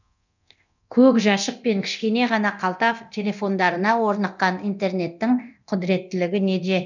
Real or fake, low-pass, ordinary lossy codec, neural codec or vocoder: fake; 7.2 kHz; none; codec, 24 kHz, 0.9 kbps, DualCodec